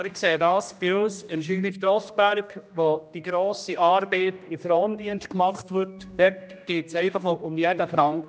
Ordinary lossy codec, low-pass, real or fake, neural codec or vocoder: none; none; fake; codec, 16 kHz, 1 kbps, X-Codec, HuBERT features, trained on general audio